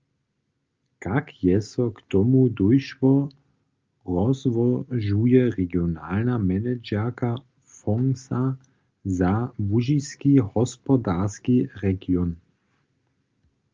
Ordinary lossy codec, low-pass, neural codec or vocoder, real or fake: Opus, 24 kbps; 7.2 kHz; none; real